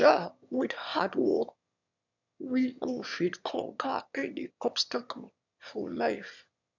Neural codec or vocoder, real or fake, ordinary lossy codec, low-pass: autoencoder, 22.05 kHz, a latent of 192 numbers a frame, VITS, trained on one speaker; fake; none; 7.2 kHz